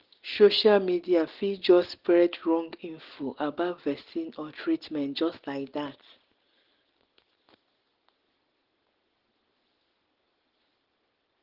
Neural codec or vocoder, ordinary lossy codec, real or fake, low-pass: none; Opus, 16 kbps; real; 5.4 kHz